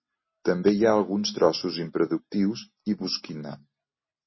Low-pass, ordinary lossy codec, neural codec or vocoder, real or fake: 7.2 kHz; MP3, 24 kbps; none; real